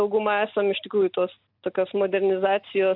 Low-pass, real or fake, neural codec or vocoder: 5.4 kHz; real; none